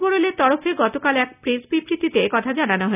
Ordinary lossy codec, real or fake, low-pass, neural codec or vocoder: none; real; 3.6 kHz; none